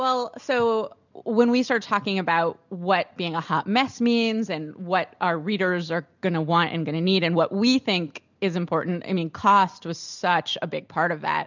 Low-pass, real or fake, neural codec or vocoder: 7.2 kHz; real; none